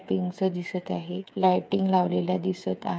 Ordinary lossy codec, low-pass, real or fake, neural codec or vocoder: none; none; fake; codec, 16 kHz, 8 kbps, FreqCodec, smaller model